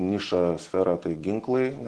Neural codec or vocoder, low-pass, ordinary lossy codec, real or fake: none; 10.8 kHz; Opus, 16 kbps; real